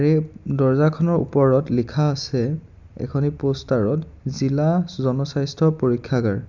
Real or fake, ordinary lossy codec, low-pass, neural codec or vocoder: real; none; 7.2 kHz; none